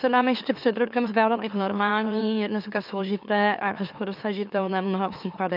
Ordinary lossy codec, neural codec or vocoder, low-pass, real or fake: AAC, 48 kbps; autoencoder, 44.1 kHz, a latent of 192 numbers a frame, MeloTTS; 5.4 kHz; fake